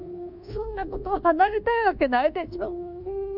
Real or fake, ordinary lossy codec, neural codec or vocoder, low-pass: fake; none; codec, 24 kHz, 1.2 kbps, DualCodec; 5.4 kHz